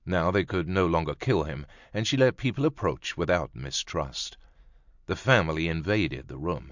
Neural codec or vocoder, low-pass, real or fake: none; 7.2 kHz; real